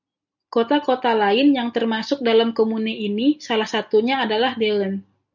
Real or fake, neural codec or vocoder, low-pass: real; none; 7.2 kHz